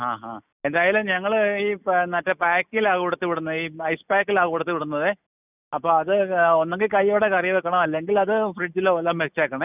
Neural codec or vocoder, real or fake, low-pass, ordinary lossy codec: none; real; 3.6 kHz; none